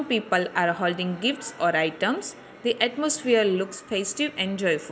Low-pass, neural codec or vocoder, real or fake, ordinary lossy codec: none; none; real; none